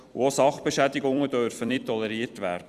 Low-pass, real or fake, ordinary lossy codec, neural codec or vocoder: 14.4 kHz; fake; Opus, 64 kbps; vocoder, 44.1 kHz, 128 mel bands every 256 samples, BigVGAN v2